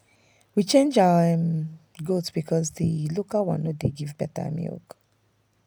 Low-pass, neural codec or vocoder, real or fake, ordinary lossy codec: 19.8 kHz; none; real; none